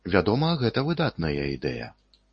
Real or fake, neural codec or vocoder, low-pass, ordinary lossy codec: real; none; 10.8 kHz; MP3, 32 kbps